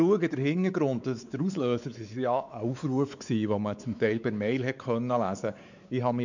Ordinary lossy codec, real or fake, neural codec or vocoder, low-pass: none; fake; codec, 16 kHz, 4 kbps, X-Codec, WavLM features, trained on Multilingual LibriSpeech; 7.2 kHz